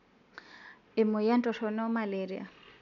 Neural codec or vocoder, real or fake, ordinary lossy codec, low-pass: none; real; none; 7.2 kHz